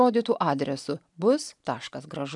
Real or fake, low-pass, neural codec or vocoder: real; 10.8 kHz; none